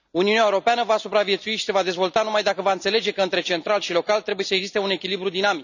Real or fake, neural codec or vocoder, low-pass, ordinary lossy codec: real; none; 7.2 kHz; none